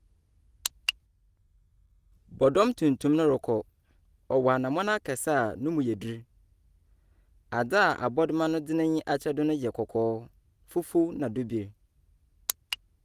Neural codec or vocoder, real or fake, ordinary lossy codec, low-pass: none; real; Opus, 24 kbps; 14.4 kHz